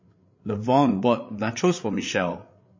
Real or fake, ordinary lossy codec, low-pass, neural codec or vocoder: fake; MP3, 32 kbps; 7.2 kHz; codec, 16 kHz, 8 kbps, FreqCodec, larger model